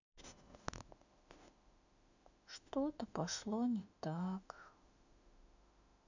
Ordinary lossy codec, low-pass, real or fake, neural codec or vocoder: none; 7.2 kHz; fake; autoencoder, 48 kHz, 32 numbers a frame, DAC-VAE, trained on Japanese speech